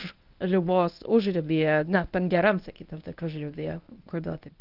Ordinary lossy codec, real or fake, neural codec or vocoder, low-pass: Opus, 32 kbps; fake; codec, 24 kHz, 0.9 kbps, WavTokenizer, medium speech release version 1; 5.4 kHz